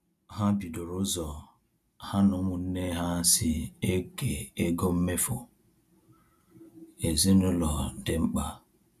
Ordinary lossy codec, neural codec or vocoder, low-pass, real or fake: none; none; 14.4 kHz; real